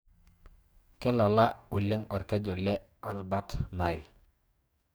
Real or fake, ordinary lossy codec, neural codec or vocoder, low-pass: fake; none; codec, 44.1 kHz, 2.6 kbps, DAC; none